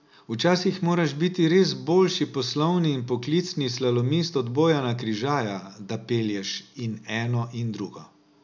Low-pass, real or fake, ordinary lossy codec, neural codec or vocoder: 7.2 kHz; real; MP3, 64 kbps; none